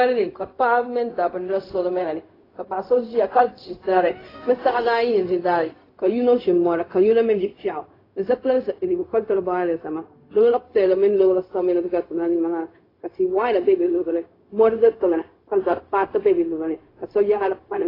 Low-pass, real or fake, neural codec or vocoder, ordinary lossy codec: 5.4 kHz; fake; codec, 16 kHz, 0.4 kbps, LongCat-Audio-Codec; AAC, 24 kbps